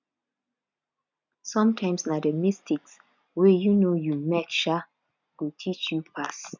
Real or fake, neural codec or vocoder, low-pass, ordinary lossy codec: real; none; 7.2 kHz; none